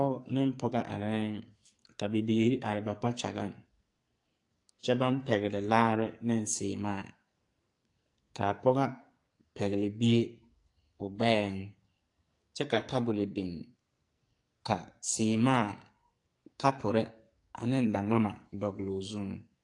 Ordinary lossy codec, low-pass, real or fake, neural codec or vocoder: AAC, 48 kbps; 10.8 kHz; fake; codec, 44.1 kHz, 2.6 kbps, SNAC